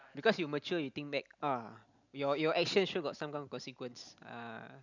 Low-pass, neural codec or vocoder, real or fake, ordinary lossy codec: 7.2 kHz; none; real; none